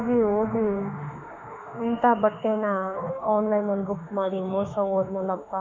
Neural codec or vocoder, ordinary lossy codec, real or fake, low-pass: autoencoder, 48 kHz, 32 numbers a frame, DAC-VAE, trained on Japanese speech; MP3, 64 kbps; fake; 7.2 kHz